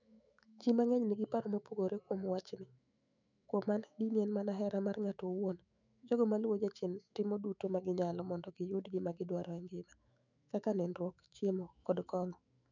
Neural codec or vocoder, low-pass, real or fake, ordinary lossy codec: autoencoder, 48 kHz, 128 numbers a frame, DAC-VAE, trained on Japanese speech; 7.2 kHz; fake; none